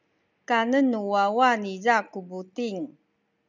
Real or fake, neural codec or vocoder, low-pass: real; none; 7.2 kHz